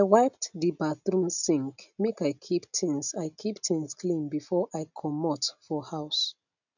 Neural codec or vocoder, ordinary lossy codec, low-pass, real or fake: none; none; 7.2 kHz; real